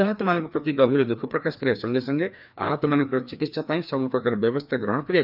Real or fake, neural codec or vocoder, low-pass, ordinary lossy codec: fake; codec, 16 kHz, 2 kbps, FreqCodec, larger model; 5.4 kHz; none